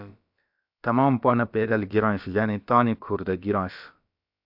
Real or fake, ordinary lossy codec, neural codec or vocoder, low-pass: fake; AAC, 48 kbps; codec, 16 kHz, about 1 kbps, DyCAST, with the encoder's durations; 5.4 kHz